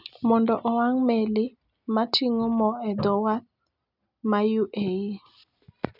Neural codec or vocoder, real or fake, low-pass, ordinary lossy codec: none; real; 5.4 kHz; none